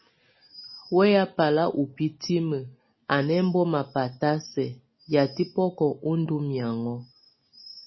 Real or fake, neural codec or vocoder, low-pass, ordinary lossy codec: real; none; 7.2 kHz; MP3, 24 kbps